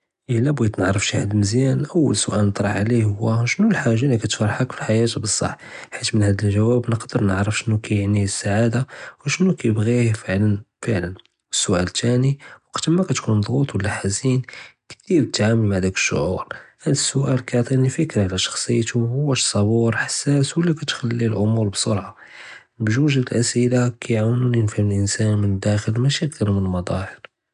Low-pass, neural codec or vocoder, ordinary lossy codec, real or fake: 10.8 kHz; none; none; real